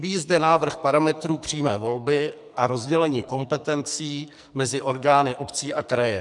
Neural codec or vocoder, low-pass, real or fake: codec, 44.1 kHz, 2.6 kbps, SNAC; 10.8 kHz; fake